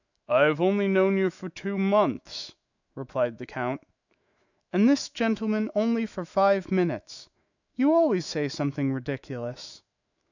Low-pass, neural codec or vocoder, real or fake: 7.2 kHz; autoencoder, 48 kHz, 128 numbers a frame, DAC-VAE, trained on Japanese speech; fake